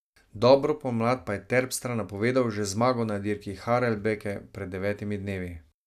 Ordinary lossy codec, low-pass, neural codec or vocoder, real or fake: none; 14.4 kHz; none; real